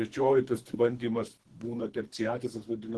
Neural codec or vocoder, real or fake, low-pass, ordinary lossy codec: codec, 24 kHz, 3 kbps, HILCodec; fake; 10.8 kHz; Opus, 16 kbps